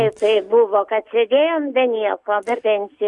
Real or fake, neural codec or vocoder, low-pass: real; none; 10.8 kHz